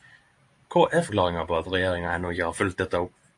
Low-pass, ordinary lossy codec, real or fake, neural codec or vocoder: 10.8 kHz; AAC, 64 kbps; fake; vocoder, 44.1 kHz, 128 mel bands every 512 samples, BigVGAN v2